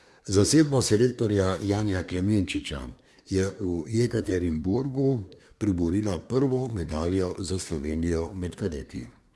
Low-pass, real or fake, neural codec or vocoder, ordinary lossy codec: none; fake; codec, 24 kHz, 1 kbps, SNAC; none